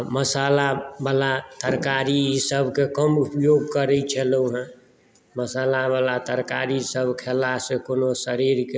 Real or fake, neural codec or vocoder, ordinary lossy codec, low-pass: real; none; none; none